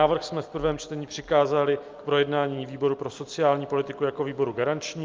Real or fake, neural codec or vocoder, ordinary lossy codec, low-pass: real; none; Opus, 24 kbps; 7.2 kHz